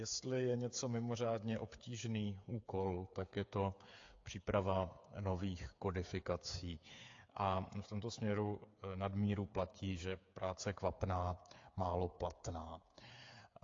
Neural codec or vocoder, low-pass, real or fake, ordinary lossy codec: codec, 16 kHz, 8 kbps, FreqCodec, smaller model; 7.2 kHz; fake; AAC, 48 kbps